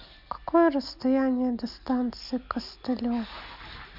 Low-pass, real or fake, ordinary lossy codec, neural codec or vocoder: 5.4 kHz; real; none; none